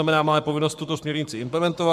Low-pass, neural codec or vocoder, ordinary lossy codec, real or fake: 14.4 kHz; codec, 44.1 kHz, 7.8 kbps, Pupu-Codec; MP3, 96 kbps; fake